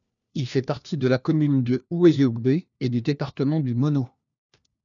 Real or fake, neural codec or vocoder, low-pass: fake; codec, 16 kHz, 1 kbps, FunCodec, trained on LibriTTS, 50 frames a second; 7.2 kHz